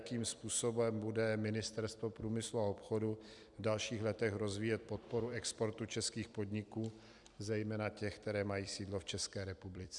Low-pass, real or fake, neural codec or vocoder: 10.8 kHz; fake; vocoder, 44.1 kHz, 128 mel bands every 256 samples, BigVGAN v2